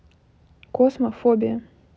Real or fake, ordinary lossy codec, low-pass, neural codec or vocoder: real; none; none; none